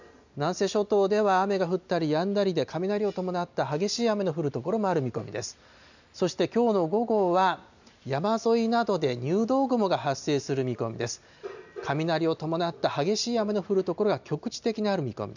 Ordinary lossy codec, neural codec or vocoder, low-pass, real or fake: none; none; 7.2 kHz; real